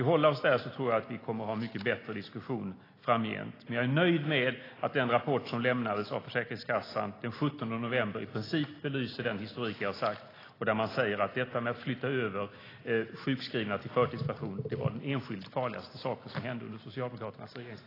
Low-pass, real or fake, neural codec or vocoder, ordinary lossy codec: 5.4 kHz; real; none; AAC, 24 kbps